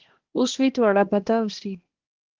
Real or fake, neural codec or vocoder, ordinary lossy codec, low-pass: fake; codec, 16 kHz, 1 kbps, X-Codec, HuBERT features, trained on balanced general audio; Opus, 16 kbps; 7.2 kHz